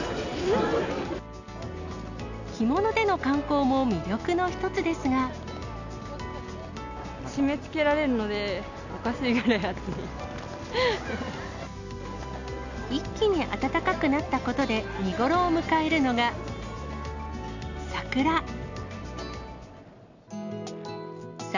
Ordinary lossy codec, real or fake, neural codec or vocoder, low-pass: none; real; none; 7.2 kHz